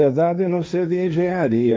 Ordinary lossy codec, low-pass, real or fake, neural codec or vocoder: none; none; fake; codec, 16 kHz, 1.1 kbps, Voila-Tokenizer